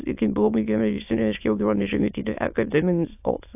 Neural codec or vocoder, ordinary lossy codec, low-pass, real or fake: autoencoder, 22.05 kHz, a latent of 192 numbers a frame, VITS, trained on many speakers; AAC, 32 kbps; 3.6 kHz; fake